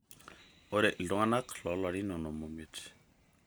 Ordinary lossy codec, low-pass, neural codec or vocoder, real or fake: none; none; none; real